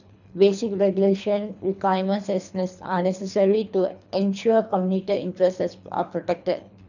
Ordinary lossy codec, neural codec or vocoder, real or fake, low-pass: none; codec, 24 kHz, 3 kbps, HILCodec; fake; 7.2 kHz